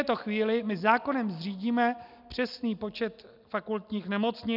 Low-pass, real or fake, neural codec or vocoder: 5.4 kHz; real; none